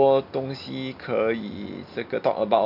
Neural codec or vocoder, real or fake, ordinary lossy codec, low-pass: none; real; none; 5.4 kHz